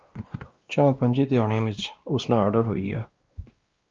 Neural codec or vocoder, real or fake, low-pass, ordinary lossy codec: codec, 16 kHz, 1 kbps, X-Codec, WavLM features, trained on Multilingual LibriSpeech; fake; 7.2 kHz; Opus, 24 kbps